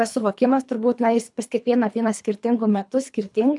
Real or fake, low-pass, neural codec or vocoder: fake; 10.8 kHz; codec, 24 kHz, 3 kbps, HILCodec